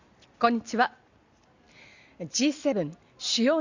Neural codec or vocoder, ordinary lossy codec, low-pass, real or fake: none; Opus, 64 kbps; 7.2 kHz; real